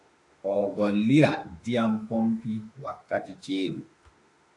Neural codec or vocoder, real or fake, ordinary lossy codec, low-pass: autoencoder, 48 kHz, 32 numbers a frame, DAC-VAE, trained on Japanese speech; fake; AAC, 48 kbps; 10.8 kHz